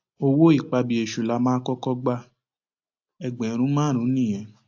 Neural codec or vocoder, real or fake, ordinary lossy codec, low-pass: none; real; AAC, 48 kbps; 7.2 kHz